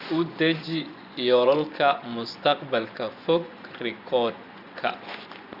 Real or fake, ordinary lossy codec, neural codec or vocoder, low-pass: fake; Opus, 64 kbps; vocoder, 24 kHz, 100 mel bands, Vocos; 5.4 kHz